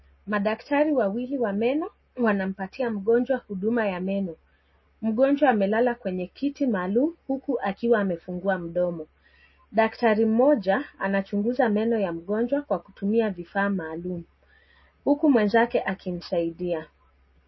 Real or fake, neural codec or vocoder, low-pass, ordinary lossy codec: real; none; 7.2 kHz; MP3, 24 kbps